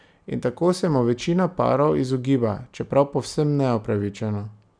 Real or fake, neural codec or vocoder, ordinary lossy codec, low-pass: real; none; none; 9.9 kHz